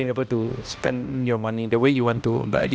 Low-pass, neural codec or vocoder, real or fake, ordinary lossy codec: none; codec, 16 kHz, 1 kbps, X-Codec, HuBERT features, trained on balanced general audio; fake; none